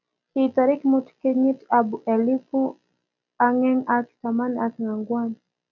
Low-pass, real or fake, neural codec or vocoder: 7.2 kHz; real; none